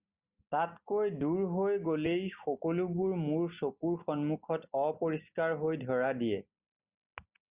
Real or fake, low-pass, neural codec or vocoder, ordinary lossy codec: real; 3.6 kHz; none; Opus, 64 kbps